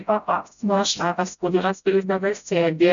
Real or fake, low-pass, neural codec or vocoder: fake; 7.2 kHz; codec, 16 kHz, 0.5 kbps, FreqCodec, smaller model